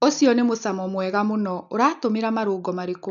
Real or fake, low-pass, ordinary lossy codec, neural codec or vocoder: real; 7.2 kHz; none; none